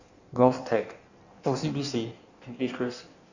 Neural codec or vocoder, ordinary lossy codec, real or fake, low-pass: codec, 16 kHz in and 24 kHz out, 1.1 kbps, FireRedTTS-2 codec; none; fake; 7.2 kHz